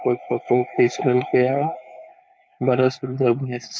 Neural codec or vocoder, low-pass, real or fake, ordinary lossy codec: codec, 16 kHz, 4.8 kbps, FACodec; none; fake; none